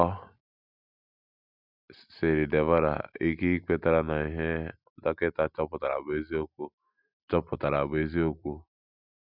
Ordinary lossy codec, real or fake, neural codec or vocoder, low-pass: none; real; none; 5.4 kHz